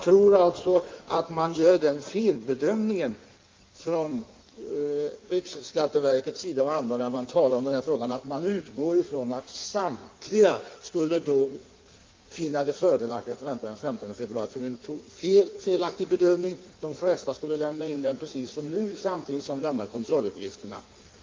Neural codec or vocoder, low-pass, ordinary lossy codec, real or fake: codec, 16 kHz in and 24 kHz out, 1.1 kbps, FireRedTTS-2 codec; 7.2 kHz; Opus, 24 kbps; fake